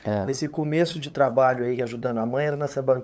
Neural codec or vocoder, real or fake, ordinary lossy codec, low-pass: codec, 16 kHz, 8 kbps, FunCodec, trained on LibriTTS, 25 frames a second; fake; none; none